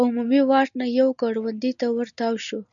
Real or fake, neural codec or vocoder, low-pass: real; none; 7.2 kHz